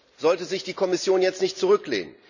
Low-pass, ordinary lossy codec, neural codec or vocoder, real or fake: 7.2 kHz; none; none; real